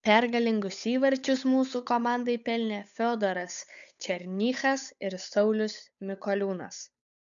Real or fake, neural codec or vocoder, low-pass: fake; codec, 16 kHz, 8 kbps, FunCodec, trained on Chinese and English, 25 frames a second; 7.2 kHz